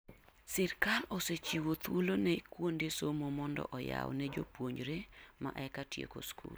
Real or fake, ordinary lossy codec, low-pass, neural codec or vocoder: real; none; none; none